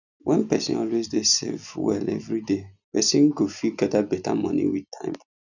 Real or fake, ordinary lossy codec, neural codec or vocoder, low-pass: real; none; none; 7.2 kHz